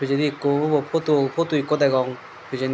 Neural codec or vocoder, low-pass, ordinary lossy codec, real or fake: none; none; none; real